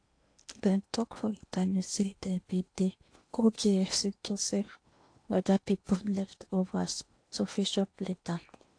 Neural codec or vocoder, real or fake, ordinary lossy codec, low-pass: codec, 16 kHz in and 24 kHz out, 0.8 kbps, FocalCodec, streaming, 65536 codes; fake; AAC, 48 kbps; 9.9 kHz